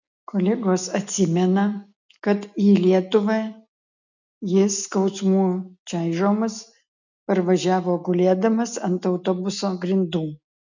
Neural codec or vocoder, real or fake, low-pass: none; real; 7.2 kHz